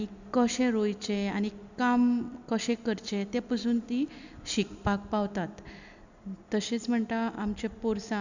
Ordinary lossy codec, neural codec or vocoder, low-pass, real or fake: none; none; 7.2 kHz; real